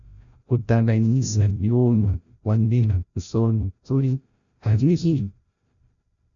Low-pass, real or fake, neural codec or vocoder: 7.2 kHz; fake; codec, 16 kHz, 0.5 kbps, FreqCodec, larger model